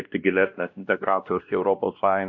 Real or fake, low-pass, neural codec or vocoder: fake; 7.2 kHz; codec, 16 kHz, 1 kbps, X-Codec, WavLM features, trained on Multilingual LibriSpeech